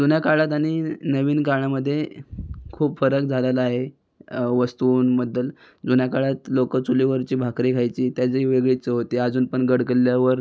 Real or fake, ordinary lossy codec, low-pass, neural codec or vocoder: real; none; none; none